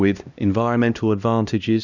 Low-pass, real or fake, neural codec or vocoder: 7.2 kHz; fake; codec, 16 kHz, 1 kbps, X-Codec, HuBERT features, trained on LibriSpeech